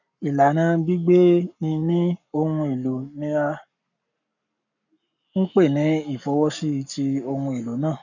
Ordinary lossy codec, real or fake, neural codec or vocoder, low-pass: none; fake; codec, 44.1 kHz, 7.8 kbps, Pupu-Codec; 7.2 kHz